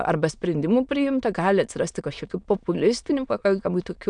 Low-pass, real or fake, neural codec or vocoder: 9.9 kHz; fake; autoencoder, 22.05 kHz, a latent of 192 numbers a frame, VITS, trained on many speakers